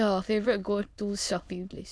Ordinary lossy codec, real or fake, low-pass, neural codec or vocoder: none; fake; none; autoencoder, 22.05 kHz, a latent of 192 numbers a frame, VITS, trained on many speakers